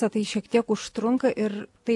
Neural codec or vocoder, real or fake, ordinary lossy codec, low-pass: none; real; AAC, 64 kbps; 10.8 kHz